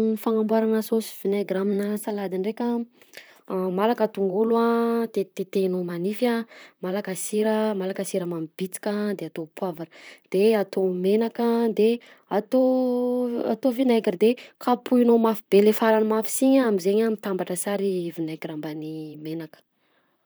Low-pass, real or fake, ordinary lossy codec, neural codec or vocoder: none; fake; none; vocoder, 44.1 kHz, 128 mel bands, Pupu-Vocoder